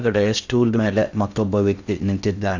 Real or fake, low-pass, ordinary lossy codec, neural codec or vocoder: fake; 7.2 kHz; Opus, 64 kbps; codec, 16 kHz in and 24 kHz out, 0.6 kbps, FocalCodec, streaming, 2048 codes